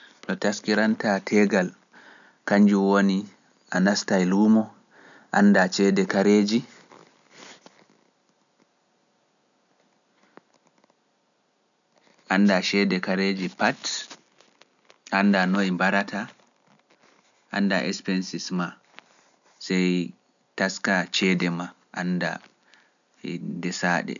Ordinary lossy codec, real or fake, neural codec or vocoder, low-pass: none; real; none; 7.2 kHz